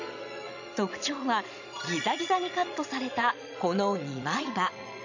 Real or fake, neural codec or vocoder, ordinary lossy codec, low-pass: fake; vocoder, 22.05 kHz, 80 mel bands, Vocos; none; 7.2 kHz